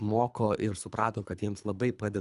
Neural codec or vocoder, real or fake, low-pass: codec, 24 kHz, 3 kbps, HILCodec; fake; 10.8 kHz